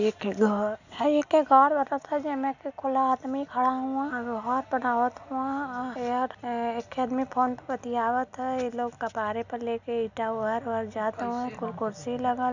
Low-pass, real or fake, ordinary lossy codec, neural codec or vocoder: 7.2 kHz; real; none; none